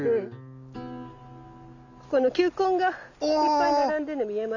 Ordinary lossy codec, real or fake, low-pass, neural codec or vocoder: none; real; 7.2 kHz; none